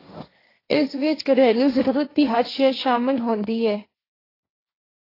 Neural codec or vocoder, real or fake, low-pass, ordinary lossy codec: codec, 16 kHz, 1.1 kbps, Voila-Tokenizer; fake; 5.4 kHz; AAC, 24 kbps